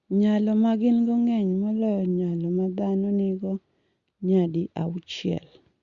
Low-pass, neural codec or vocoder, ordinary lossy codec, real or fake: 7.2 kHz; none; Opus, 64 kbps; real